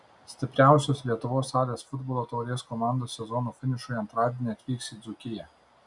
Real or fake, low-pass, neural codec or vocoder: real; 10.8 kHz; none